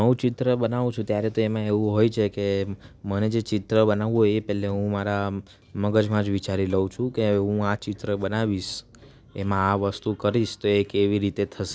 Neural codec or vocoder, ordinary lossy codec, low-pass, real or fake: none; none; none; real